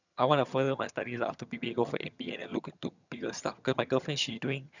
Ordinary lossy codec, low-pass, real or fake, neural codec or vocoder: none; 7.2 kHz; fake; vocoder, 22.05 kHz, 80 mel bands, HiFi-GAN